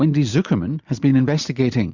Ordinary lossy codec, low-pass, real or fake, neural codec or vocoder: Opus, 64 kbps; 7.2 kHz; real; none